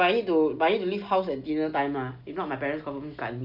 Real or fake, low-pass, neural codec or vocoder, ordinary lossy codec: real; 5.4 kHz; none; none